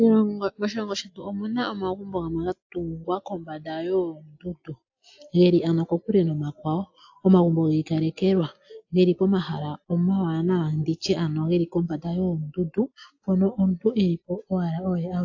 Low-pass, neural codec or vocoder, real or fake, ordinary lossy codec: 7.2 kHz; none; real; AAC, 48 kbps